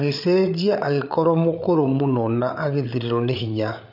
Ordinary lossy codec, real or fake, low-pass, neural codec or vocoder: none; fake; 5.4 kHz; codec, 16 kHz, 8 kbps, FreqCodec, larger model